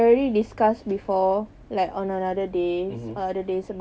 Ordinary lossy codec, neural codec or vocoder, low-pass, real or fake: none; none; none; real